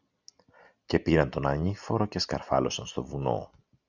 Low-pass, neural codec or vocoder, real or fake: 7.2 kHz; none; real